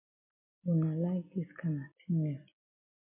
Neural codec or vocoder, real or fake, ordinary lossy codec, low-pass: none; real; MP3, 32 kbps; 3.6 kHz